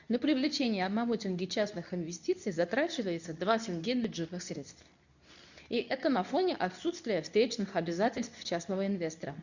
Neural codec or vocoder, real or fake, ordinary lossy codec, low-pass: codec, 24 kHz, 0.9 kbps, WavTokenizer, medium speech release version 2; fake; none; 7.2 kHz